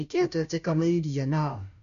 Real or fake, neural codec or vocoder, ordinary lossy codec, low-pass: fake; codec, 16 kHz, 0.5 kbps, FunCodec, trained on Chinese and English, 25 frames a second; none; 7.2 kHz